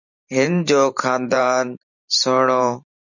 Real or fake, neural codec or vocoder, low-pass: fake; vocoder, 44.1 kHz, 128 mel bands every 512 samples, BigVGAN v2; 7.2 kHz